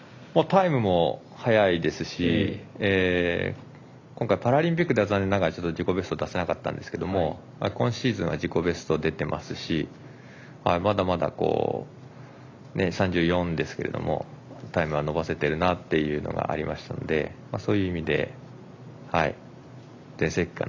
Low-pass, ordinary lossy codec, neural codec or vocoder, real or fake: 7.2 kHz; AAC, 48 kbps; none; real